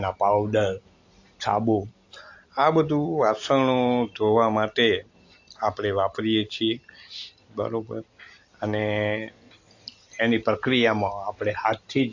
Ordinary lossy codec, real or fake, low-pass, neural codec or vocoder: AAC, 48 kbps; real; 7.2 kHz; none